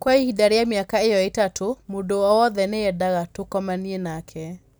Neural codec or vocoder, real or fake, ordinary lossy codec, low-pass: none; real; none; none